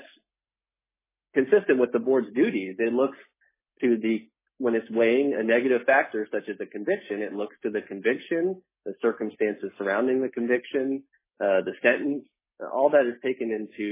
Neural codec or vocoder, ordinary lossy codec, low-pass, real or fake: none; MP3, 16 kbps; 3.6 kHz; real